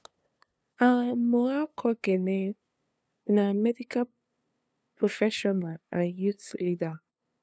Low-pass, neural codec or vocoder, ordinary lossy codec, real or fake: none; codec, 16 kHz, 2 kbps, FunCodec, trained on LibriTTS, 25 frames a second; none; fake